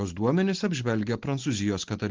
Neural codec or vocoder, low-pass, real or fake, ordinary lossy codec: none; 7.2 kHz; real; Opus, 16 kbps